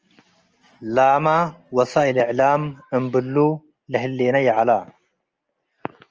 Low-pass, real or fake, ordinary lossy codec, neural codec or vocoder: 7.2 kHz; real; Opus, 24 kbps; none